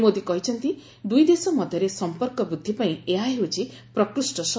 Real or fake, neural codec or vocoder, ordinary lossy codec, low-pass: real; none; none; none